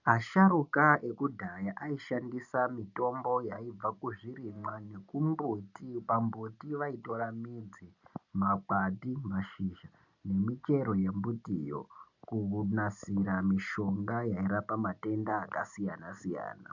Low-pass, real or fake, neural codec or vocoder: 7.2 kHz; real; none